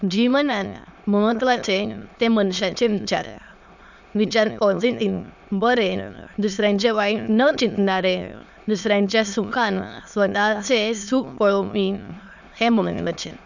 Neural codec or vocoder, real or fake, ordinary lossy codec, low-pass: autoencoder, 22.05 kHz, a latent of 192 numbers a frame, VITS, trained on many speakers; fake; none; 7.2 kHz